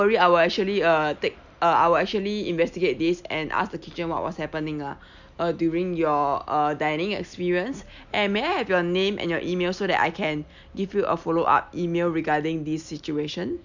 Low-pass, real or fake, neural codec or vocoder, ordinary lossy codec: 7.2 kHz; real; none; none